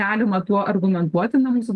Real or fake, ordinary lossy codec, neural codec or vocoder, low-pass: real; Opus, 24 kbps; none; 10.8 kHz